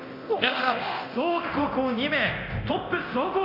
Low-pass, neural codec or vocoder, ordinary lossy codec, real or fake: 5.4 kHz; codec, 24 kHz, 0.9 kbps, DualCodec; none; fake